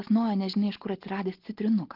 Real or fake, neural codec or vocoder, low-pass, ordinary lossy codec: real; none; 5.4 kHz; Opus, 16 kbps